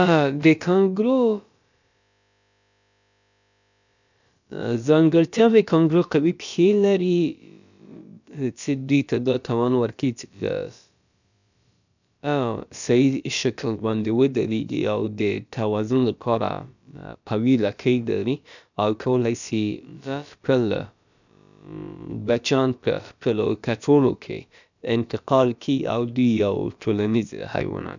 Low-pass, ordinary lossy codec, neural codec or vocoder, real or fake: 7.2 kHz; none; codec, 16 kHz, about 1 kbps, DyCAST, with the encoder's durations; fake